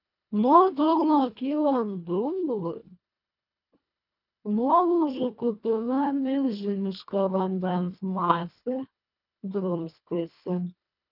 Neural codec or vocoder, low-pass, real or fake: codec, 24 kHz, 1.5 kbps, HILCodec; 5.4 kHz; fake